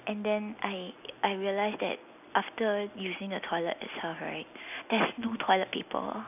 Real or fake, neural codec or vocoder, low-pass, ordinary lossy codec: real; none; 3.6 kHz; none